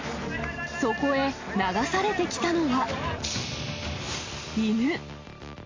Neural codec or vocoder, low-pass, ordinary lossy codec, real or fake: none; 7.2 kHz; AAC, 32 kbps; real